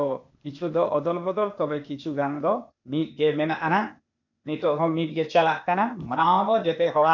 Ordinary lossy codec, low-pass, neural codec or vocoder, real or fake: none; 7.2 kHz; codec, 16 kHz, 0.8 kbps, ZipCodec; fake